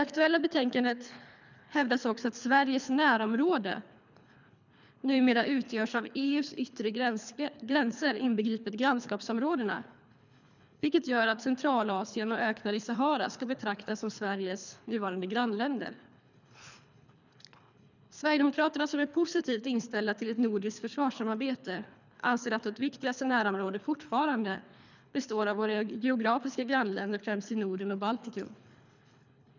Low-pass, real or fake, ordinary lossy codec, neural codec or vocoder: 7.2 kHz; fake; none; codec, 24 kHz, 3 kbps, HILCodec